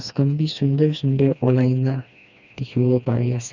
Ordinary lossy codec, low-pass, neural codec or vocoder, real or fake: none; 7.2 kHz; codec, 16 kHz, 2 kbps, FreqCodec, smaller model; fake